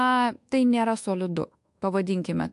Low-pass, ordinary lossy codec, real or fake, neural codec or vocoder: 10.8 kHz; AAC, 64 kbps; fake; codec, 24 kHz, 1.2 kbps, DualCodec